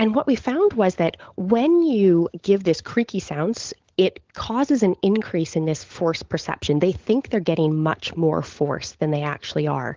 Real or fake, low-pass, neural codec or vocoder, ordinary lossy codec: fake; 7.2 kHz; codec, 16 kHz, 16 kbps, FunCodec, trained on LibriTTS, 50 frames a second; Opus, 16 kbps